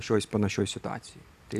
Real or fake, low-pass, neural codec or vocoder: real; 14.4 kHz; none